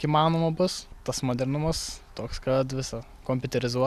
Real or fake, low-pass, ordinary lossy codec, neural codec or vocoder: real; 14.4 kHz; Opus, 64 kbps; none